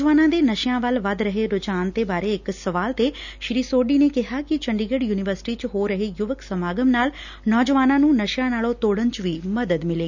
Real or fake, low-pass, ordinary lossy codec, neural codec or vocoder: real; 7.2 kHz; none; none